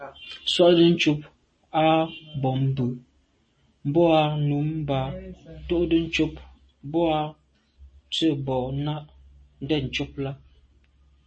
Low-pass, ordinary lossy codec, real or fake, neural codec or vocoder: 9.9 kHz; MP3, 32 kbps; real; none